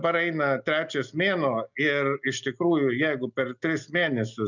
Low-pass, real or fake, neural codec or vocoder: 7.2 kHz; real; none